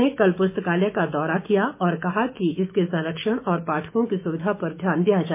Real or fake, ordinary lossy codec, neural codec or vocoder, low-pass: fake; MP3, 24 kbps; autoencoder, 48 kHz, 128 numbers a frame, DAC-VAE, trained on Japanese speech; 3.6 kHz